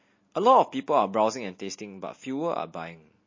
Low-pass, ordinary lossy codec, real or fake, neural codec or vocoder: 7.2 kHz; MP3, 32 kbps; real; none